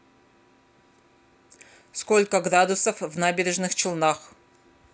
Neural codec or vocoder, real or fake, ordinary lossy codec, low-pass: none; real; none; none